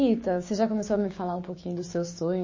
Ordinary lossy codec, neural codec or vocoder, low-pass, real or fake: MP3, 32 kbps; codec, 16 kHz, 6 kbps, DAC; 7.2 kHz; fake